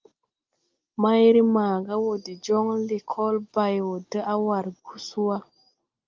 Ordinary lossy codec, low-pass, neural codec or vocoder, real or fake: Opus, 24 kbps; 7.2 kHz; none; real